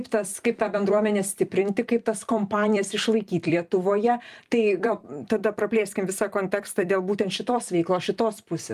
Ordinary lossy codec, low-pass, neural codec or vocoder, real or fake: Opus, 32 kbps; 14.4 kHz; vocoder, 44.1 kHz, 128 mel bands every 256 samples, BigVGAN v2; fake